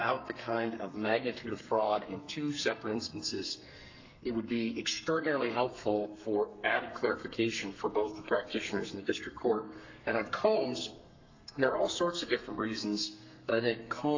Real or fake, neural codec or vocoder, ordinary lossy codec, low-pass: fake; codec, 32 kHz, 1.9 kbps, SNAC; AAC, 48 kbps; 7.2 kHz